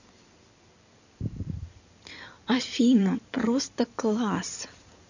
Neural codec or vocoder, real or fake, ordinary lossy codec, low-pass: codec, 16 kHz in and 24 kHz out, 2.2 kbps, FireRedTTS-2 codec; fake; none; 7.2 kHz